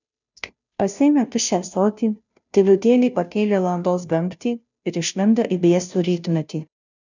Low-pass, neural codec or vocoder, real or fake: 7.2 kHz; codec, 16 kHz, 0.5 kbps, FunCodec, trained on Chinese and English, 25 frames a second; fake